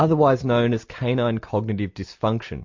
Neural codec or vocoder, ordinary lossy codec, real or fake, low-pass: none; MP3, 48 kbps; real; 7.2 kHz